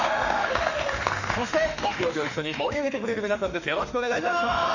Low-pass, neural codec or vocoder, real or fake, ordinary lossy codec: 7.2 kHz; autoencoder, 48 kHz, 32 numbers a frame, DAC-VAE, trained on Japanese speech; fake; none